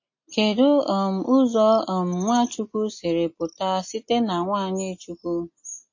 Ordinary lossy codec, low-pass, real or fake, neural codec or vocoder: MP3, 32 kbps; 7.2 kHz; real; none